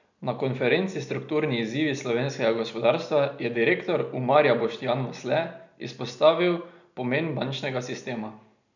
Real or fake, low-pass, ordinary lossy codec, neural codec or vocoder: real; 7.2 kHz; none; none